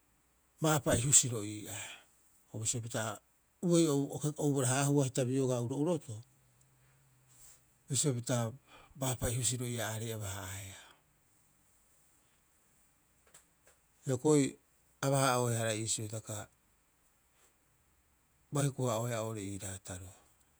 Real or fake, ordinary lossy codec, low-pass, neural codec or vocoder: fake; none; none; vocoder, 48 kHz, 128 mel bands, Vocos